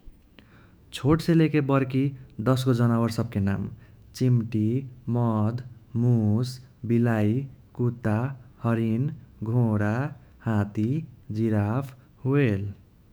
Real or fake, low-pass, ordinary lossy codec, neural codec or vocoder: fake; none; none; autoencoder, 48 kHz, 128 numbers a frame, DAC-VAE, trained on Japanese speech